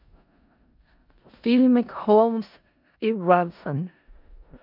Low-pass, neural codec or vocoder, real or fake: 5.4 kHz; codec, 16 kHz in and 24 kHz out, 0.4 kbps, LongCat-Audio-Codec, four codebook decoder; fake